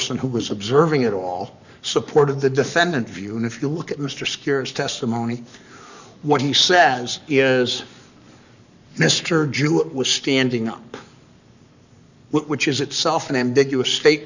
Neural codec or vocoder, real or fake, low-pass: codec, 44.1 kHz, 7.8 kbps, Pupu-Codec; fake; 7.2 kHz